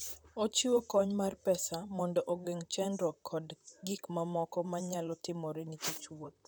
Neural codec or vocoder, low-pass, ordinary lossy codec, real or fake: vocoder, 44.1 kHz, 128 mel bands, Pupu-Vocoder; none; none; fake